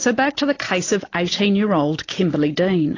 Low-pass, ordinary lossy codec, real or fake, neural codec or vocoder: 7.2 kHz; AAC, 32 kbps; real; none